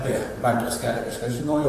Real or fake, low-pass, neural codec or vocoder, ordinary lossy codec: fake; 14.4 kHz; vocoder, 44.1 kHz, 128 mel bands, Pupu-Vocoder; AAC, 48 kbps